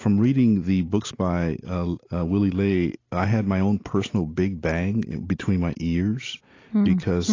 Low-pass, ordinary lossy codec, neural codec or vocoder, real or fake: 7.2 kHz; AAC, 32 kbps; none; real